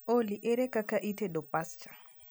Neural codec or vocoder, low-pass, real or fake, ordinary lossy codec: none; none; real; none